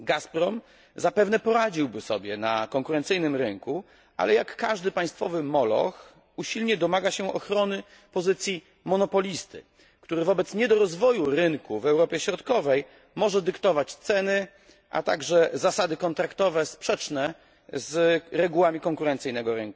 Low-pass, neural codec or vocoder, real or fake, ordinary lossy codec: none; none; real; none